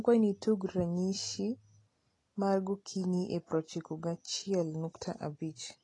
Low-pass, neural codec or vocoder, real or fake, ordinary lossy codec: 10.8 kHz; none; real; AAC, 32 kbps